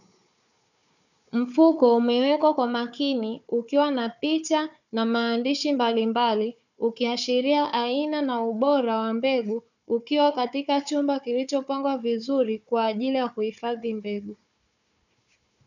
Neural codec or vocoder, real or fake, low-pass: codec, 16 kHz, 4 kbps, FunCodec, trained on Chinese and English, 50 frames a second; fake; 7.2 kHz